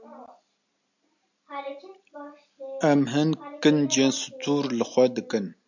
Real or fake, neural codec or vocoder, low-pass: real; none; 7.2 kHz